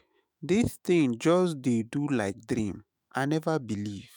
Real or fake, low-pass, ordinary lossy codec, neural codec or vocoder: fake; none; none; autoencoder, 48 kHz, 128 numbers a frame, DAC-VAE, trained on Japanese speech